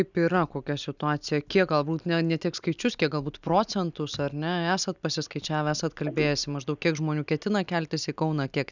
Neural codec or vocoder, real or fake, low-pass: none; real; 7.2 kHz